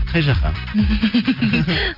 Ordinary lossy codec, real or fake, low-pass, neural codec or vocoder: none; real; 5.4 kHz; none